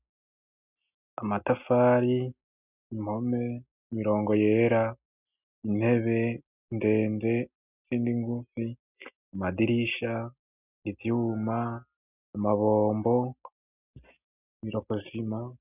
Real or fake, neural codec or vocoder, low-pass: real; none; 3.6 kHz